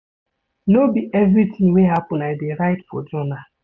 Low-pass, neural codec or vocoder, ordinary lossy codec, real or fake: 7.2 kHz; none; none; real